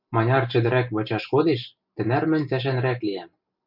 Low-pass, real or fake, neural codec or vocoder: 5.4 kHz; real; none